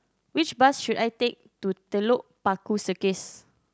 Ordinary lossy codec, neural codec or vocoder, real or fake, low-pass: none; none; real; none